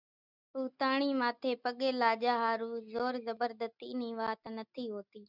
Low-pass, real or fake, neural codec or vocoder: 5.4 kHz; real; none